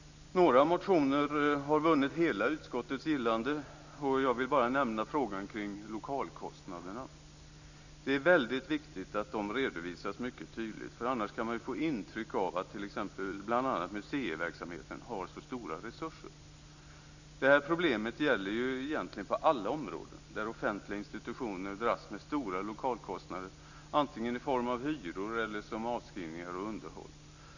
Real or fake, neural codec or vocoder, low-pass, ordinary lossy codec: real; none; 7.2 kHz; none